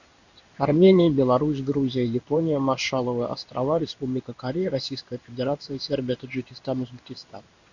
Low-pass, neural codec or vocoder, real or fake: 7.2 kHz; codec, 16 kHz in and 24 kHz out, 1 kbps, XY-Tokenizer; fake